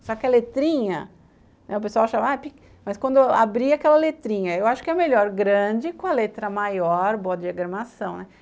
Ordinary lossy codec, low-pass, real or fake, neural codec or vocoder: none; none; real; none